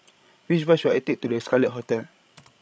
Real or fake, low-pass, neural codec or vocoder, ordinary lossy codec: fake; none; codec, 16 kHz, 16 kbps, FreqCodec, larger model; none